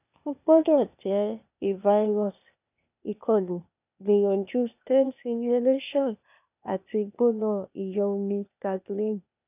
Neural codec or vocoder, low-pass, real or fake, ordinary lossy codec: codec, 16 kHz, 0.8 kbps, ZipCodec; 3.6 kHz; fake; none